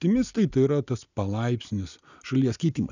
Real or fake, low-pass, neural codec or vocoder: real; 7.2 kHz; none